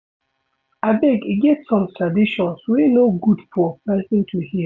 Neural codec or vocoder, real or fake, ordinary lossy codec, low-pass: none; real; none; none